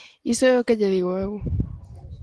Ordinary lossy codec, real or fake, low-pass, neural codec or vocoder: Opus, 16 kbps; real; 10.8 kHz; none